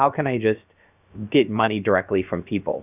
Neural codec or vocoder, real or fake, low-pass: codec, 16 kHz, about 1 kbps, DyCAST, with the encoder's durations; fake; 3.6 kHz